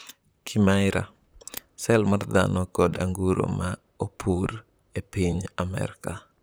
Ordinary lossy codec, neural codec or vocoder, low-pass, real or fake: none; vocoder, 44.1 kHz, 128 mel bands, Pupu-Vocoder; none; fake